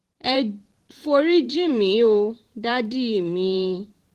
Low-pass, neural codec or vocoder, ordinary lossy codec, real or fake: 14.4 kHz; vocoder, 44.1 kHz, 128 mel bands every 512 samples, BigVGAN v2; Opus, 16 kbps; fake